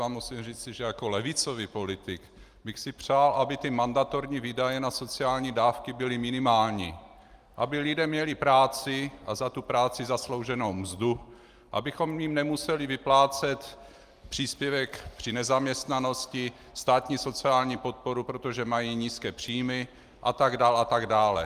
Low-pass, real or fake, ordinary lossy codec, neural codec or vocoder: 14.4 kHz; real; Opus, 32 kbps; none